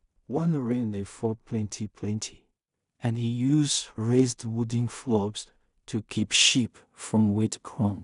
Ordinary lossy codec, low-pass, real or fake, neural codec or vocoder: none; 10.8 kHz; fake; codec, 16 kHz in and 24 kHz out, 0.4 kbps, LongCat-Audio-Codec, two codebook decoder